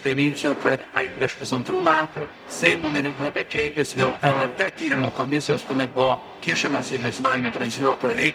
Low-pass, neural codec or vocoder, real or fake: 19.8 kHz; codec, 44.1 kHz, 0.9 kbps, DAC; fake